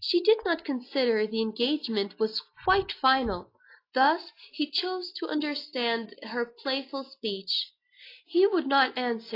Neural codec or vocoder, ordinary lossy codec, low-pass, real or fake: none; AAC, 32 kbps; 5.4 kHz; real